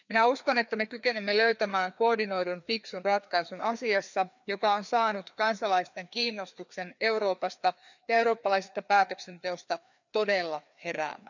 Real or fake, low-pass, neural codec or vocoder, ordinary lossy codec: fake; 7.2 kHz; codec, 16 kHz, 2 kbps, FreqCodec, larger model; none